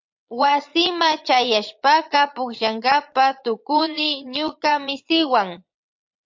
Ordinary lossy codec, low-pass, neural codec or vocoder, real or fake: MP3, 48 kbps; 7.2 kHz; vocoder, 22.05 kHz, 80 mel bands, Vocos; fake